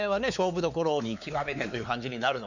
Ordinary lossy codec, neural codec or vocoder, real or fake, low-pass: none; codec, 16 kHz, 4 kbps, X-Codec, WavLM features, trained on Multilingual LibriSpeech; fake; 7.2 kHz